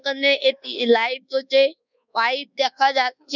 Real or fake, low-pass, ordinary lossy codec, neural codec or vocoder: fake; 7.2 kHz; none; codec, 24 kHz, 1.2 kbps, DualCodec